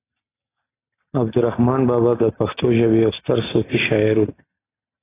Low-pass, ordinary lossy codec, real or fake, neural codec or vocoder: 3.6 kHz; AAC, 16 kbps; real; none